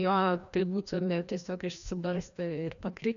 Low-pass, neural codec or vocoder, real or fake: 7.2 kHz; codec, 16 kHz, 1 kbps, FreqCodec, larger model; fake